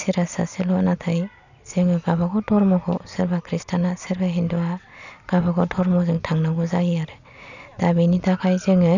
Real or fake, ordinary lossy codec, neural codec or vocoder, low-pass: real; none; none; 7.2 kHz